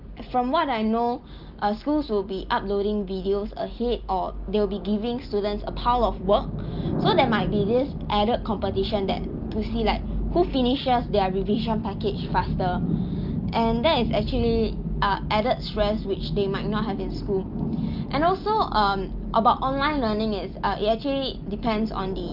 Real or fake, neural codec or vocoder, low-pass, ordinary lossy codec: real; none; 5.4 kHz; Opus, 24 kbps